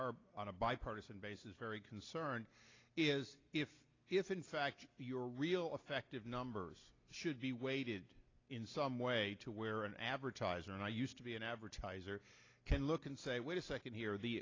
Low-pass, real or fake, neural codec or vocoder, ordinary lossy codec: 7.2 kHz; real; none; AAC, 32 kbps